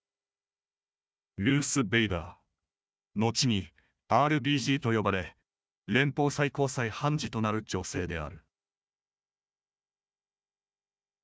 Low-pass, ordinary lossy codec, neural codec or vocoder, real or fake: none; none; codec, 16 kHz, 1 kbps, FunCodec, trained on Chinese and English, 50 frames a second; fake